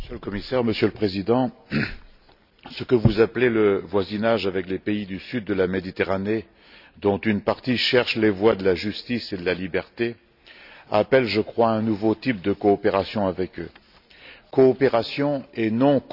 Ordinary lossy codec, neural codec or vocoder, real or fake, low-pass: none; none; real; 5.4 kHz